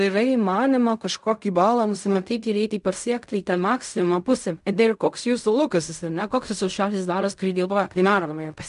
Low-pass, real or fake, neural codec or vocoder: 10.8 kHz; fake; codec, 16 kHz in and 24 kHz out, 0.4 kbps, LongCat-Audio-Codec, fine tuned four codebook decoder